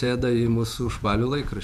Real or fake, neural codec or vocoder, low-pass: fake; vocoder, 48 kHz, 128 mel bands, Vocos; 14.4 kHz